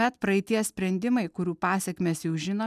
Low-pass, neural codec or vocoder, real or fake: 14.4 kHz; none; real